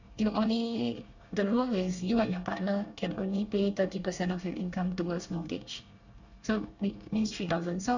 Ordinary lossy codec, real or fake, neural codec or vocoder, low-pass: none; fake; codec, 24 kHz, 1 kbps, SNAC; 7.2 kHz